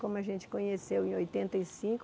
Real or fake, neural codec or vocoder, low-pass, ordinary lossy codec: real; none; none; none